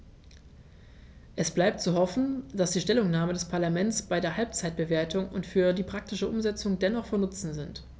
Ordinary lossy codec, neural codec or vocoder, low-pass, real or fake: none; none; none; real